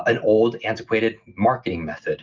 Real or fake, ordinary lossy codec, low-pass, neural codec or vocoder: real; Opus, 24 kbps; 7.2 kHz; none